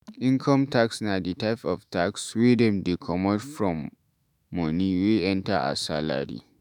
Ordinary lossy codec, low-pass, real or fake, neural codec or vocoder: none; 19.8 kHz; fake; autoencoder, 48 kHz, 128 numbers a frame, DAC-VAE, trained on Japanese speech